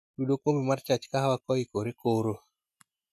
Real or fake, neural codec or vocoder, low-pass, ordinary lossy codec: real; none; 14.4 kHz; none